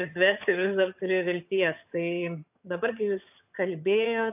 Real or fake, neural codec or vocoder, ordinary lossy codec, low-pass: fake; vocoder, 22.05 kHz, 80 mel bands, HiFi-GAN; AAC, 32 kbps; 3.6 kHz